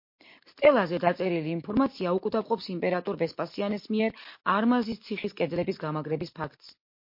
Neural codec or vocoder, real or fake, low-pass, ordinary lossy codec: none; real; 5.4 kHz; MP3, 32 kbps